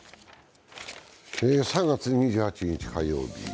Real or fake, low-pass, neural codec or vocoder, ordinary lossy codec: real; none; none; none